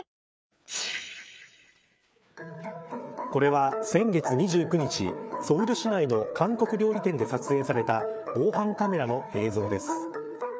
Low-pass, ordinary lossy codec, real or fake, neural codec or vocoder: none; none; fake; codec, 16 kHz, 4 kbps, FreqCodec, larger model